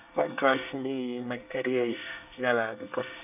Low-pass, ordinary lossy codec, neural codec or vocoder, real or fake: 3.6 kHz; none; codec, 24 kHz, 1 kbps, SNAC; fake